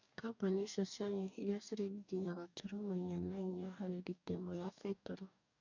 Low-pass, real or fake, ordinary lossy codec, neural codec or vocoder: 7.2 kHz; fake; none; codec, 44.1 kHz, 2.6 kbps, DAC